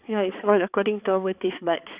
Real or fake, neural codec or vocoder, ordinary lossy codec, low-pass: fake; codec, 16 kHz, 4 kbps, X-Codec, HuBERT features, trained on balanced general audio; Opus, 64 kbps; 3.6 kHz